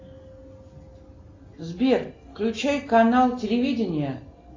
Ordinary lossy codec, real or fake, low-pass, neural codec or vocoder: AAC, 32 kbps; real; 7.2 kHz; none